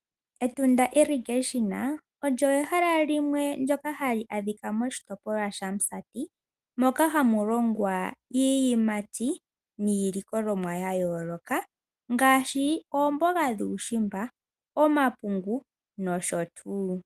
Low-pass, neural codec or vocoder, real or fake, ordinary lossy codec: 14.4 kHz; none; real; Opus, 32 kbps